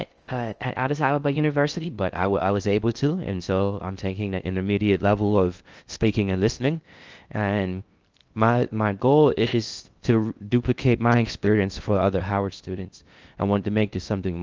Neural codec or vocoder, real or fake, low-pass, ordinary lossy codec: codec, 16 kHz in and 24 kHz out, 0.6 kbps, FocalCodec, streaming, 2048 codes; fake; 7.2 kHz; Opus, 24 kbps